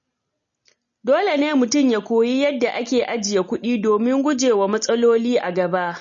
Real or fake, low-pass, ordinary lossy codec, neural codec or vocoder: real; 7.2 kHz; MP3, 32 kbps; none